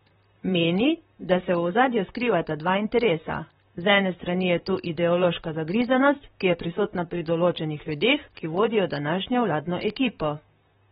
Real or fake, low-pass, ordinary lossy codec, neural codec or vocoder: fake; 19.8 kHz; AAC, 16 kbps; vocoder, 44.1 kHz, 128 mel bands, Pupu-Vocoder